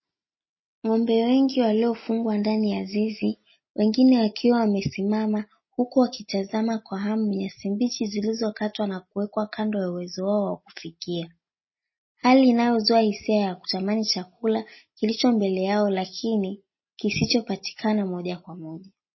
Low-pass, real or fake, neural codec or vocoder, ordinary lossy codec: 7.2 kHz; real; none; MP3, 24 kbps